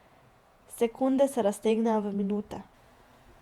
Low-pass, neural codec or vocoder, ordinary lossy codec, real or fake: 19.8 kHz; vocoder, 48 kHz, 128 mel bands, Vocos; Opus, 64 kbps; fake